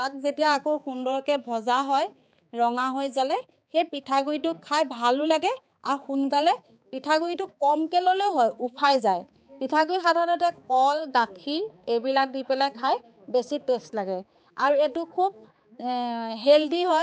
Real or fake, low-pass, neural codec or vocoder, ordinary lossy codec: fake; none; codec, 16 kHz, 4 kbps, X-Codec, HuBERT features, trained on balanced general audio; none